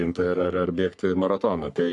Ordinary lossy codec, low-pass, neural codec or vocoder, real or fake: MP3, 96 kbps; 10.8 kHz; codec, 44.1 kHz, 3.4 kbps, Pupu-Codec; fake